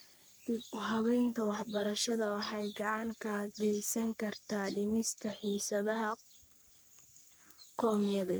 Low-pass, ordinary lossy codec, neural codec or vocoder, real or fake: none; none; codec, 44.1 kHz, 3.4 kbps, Pupu-Codec; fake